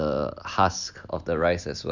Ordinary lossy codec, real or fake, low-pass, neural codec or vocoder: none; real; 7.2 kHz; none